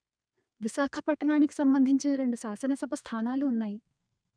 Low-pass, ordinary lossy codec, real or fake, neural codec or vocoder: 9.9 kHz; none; fake; codec, 32 kHz, 1.9 kbps, SNAC